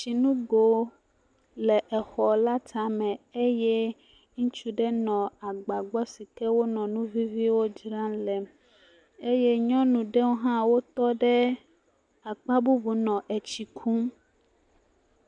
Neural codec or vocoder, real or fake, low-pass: none; real; 9.9 kHz